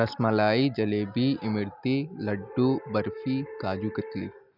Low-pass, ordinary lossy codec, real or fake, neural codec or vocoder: 5.4 kHz; none; real; none